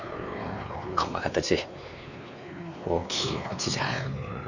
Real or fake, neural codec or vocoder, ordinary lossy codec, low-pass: fake; codec, 16 kHz, 2 kbps, X-Codec, WavLM features, trained on Multilingual LibriSpeech; none; 7.2 kHz